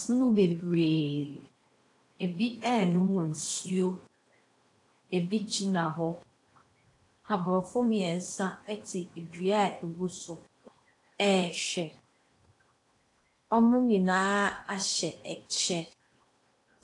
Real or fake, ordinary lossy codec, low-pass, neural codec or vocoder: fake; AAC, 48 kbps; 10.8 kHz; codec, 16 kHz in and 24 kHz out, 0.8 kbps, FocalCodec, streaming, 65536 codes